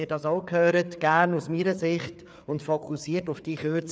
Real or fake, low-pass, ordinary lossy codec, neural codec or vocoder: fake; none; none; codec, 16 kHz, 4 kbps, FreqCodec, larger model